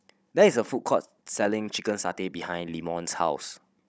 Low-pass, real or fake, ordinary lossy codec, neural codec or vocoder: none; fake; none; codec, 16 kHz, 16 kbps, FunCodec, trained on Chinese and English, 50 frames a second